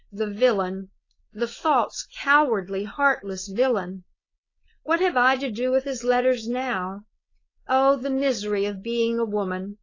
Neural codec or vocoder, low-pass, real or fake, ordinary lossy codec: codec, 16 kHz, 4.8 kbps, FACodec; 7.2 kHz; fake; AAC, 32 kbps